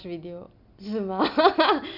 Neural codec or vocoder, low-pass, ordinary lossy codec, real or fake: none; 5.4 kHz; none; real